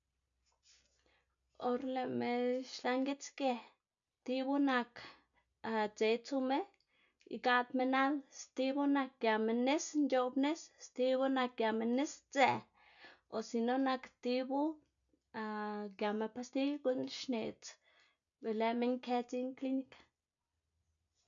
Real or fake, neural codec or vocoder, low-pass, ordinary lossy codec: real; none; 7.2 kHz; none